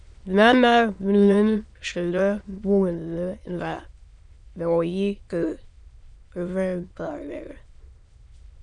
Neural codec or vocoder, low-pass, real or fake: autoencoder, 22.05 kHz, a latent of 192 numbers a frame, VITS, trained on many speakers; 9.9 kHz; fake